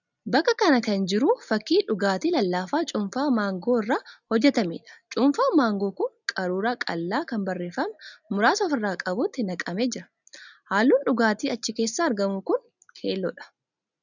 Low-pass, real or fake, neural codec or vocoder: 7.2 kHz; real; none